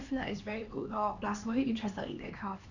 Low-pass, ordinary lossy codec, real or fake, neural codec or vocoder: 7.2 kHz; none; fake; codec, 16 kHz, 2 kbps, X-Codec, HuBERT features, trained on LibriSpeech